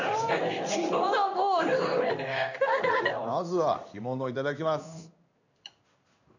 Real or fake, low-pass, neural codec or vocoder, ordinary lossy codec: fake; 7.2 kHz; codec, 16 kHz in and 24 kHz out, 1 kbps, XY-Tokenizer; none